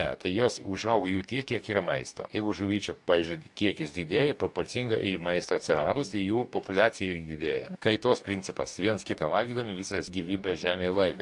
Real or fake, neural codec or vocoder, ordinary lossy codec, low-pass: fake; codec, 44.1 kHz, 2.6 kbps, DAC; AAC, 64 kbps; 10.8 kHz